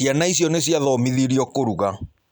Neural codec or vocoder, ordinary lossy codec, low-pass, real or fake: none; none; none; real